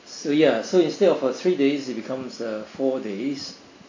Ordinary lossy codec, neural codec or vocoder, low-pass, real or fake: AAC, 32 kbps; none; 7.2 kHz; real